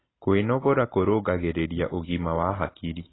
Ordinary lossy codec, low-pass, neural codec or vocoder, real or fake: AAC, 16 kbps; 7.2 kHz; none; real